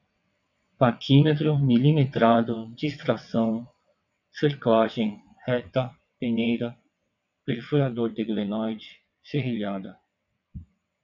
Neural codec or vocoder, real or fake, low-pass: vocoder, 22.05 kHz, 80 mel bands, WaveNeXt; fake; 7.2 kHz